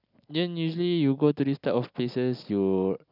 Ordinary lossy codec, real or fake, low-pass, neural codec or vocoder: none; real; 5.4 kHz; none